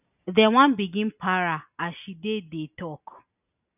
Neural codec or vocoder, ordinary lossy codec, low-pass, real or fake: none; none; 3.6 kHz; real